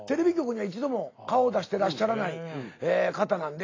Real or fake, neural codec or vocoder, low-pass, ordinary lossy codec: real; none; 7.2 kHz; AAC, 32 kbps